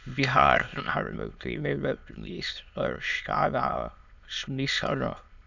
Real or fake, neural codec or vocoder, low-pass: fake; autoencoder, 22.05 kHz, a latent of 192 numbers a frame, VITS, trained on many speakers; 7.2 kHz